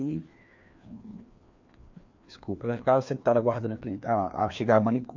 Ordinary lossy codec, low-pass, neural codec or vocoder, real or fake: MP3, 48 kbps; 7.2 kHz; codec, 16 kHz, 2 kbps, FreqCodec, larger model; fake